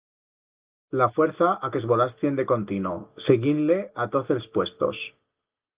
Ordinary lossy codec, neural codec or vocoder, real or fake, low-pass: Opus, 32 kbps; none; real; 3.6 kHz